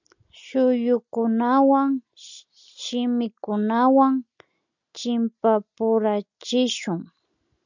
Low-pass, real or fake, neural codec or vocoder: 7.2 kHz; real; none